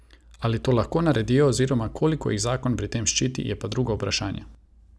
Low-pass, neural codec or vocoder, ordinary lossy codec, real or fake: none; none; none; real